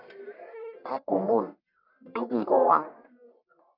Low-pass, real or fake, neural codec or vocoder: 5.4 kHz; fake; codec, 44.1 kHz, 1.7 kbps, Pupu-Codec